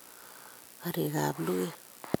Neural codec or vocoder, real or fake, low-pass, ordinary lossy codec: none; real; none; none